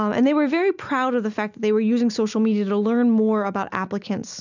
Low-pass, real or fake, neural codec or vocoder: 7.2 kHz; real; none